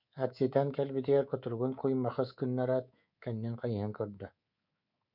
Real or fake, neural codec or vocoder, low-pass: fake; codec, 24 kHz, 3.1 kbps, DualCodec; 5.4 kHz